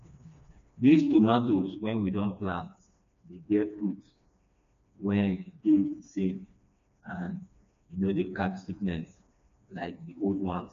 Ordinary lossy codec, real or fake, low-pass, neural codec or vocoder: MP3, 64 kbps; fake; 7.2 kHz; codec, 16 kHz, 2 kbps, FreqCodec, smaller model